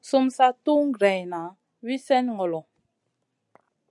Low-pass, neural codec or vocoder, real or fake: 10.8 kHz; none; real